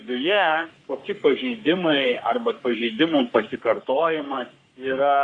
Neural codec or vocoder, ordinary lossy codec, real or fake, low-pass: codec, 44.1 kHz, 3.4 kbps, Pupu-Codec; Opus, 64 kbps; fake; 9.9 kHz